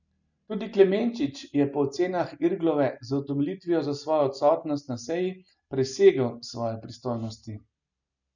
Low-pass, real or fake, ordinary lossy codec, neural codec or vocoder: 7.2 kHz; real; none; none